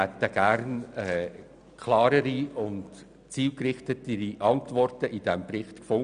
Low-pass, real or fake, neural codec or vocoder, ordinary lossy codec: 9.9 kHz; real; none; none